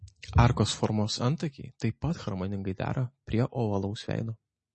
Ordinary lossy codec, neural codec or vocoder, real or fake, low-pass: MP3, 32 kbps; none; real; 10.8 kHz